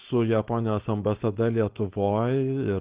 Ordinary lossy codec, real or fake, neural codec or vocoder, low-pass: Opus, 16 kbps; fake; codec, 16 kHz, 4.8 kbps, FACodec; 3.6 kHz